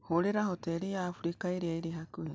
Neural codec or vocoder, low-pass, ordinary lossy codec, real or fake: none; none; none; real